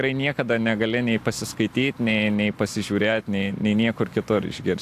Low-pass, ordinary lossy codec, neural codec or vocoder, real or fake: 14.4 kHz; AAC, 64 kbps; none; real